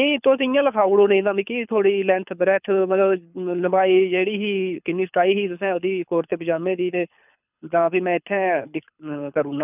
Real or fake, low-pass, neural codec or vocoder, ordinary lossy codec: fake; 3.6 kHz; codec, 24 kHz, 6 kbps, HILCodec; none